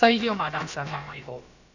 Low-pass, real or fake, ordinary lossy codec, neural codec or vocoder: 7.2 kHz; fake; none; codec, 16 kHz, about 1 kbps, DyCAST, with the encoder's durations